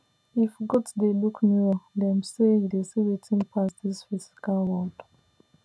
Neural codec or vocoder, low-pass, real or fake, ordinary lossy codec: none; none; real; none